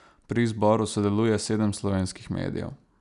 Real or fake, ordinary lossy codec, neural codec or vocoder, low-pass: real; none; none; 10.8 kHz